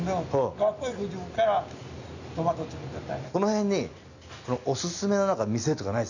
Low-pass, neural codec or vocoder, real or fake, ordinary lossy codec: 7.2 kHz; none; real; none